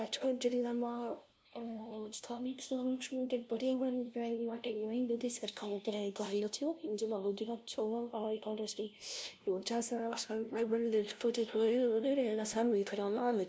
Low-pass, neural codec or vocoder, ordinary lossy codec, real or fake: none; codec, 16 kHz, 0.5 kbps, FunCodec, trained on LibriTTS, 25 frames a second; none; fake